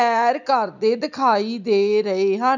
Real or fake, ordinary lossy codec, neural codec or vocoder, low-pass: real; none; none; 7.2 kHz